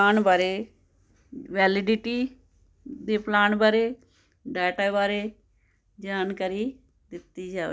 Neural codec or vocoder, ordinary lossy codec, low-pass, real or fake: none; none; none; real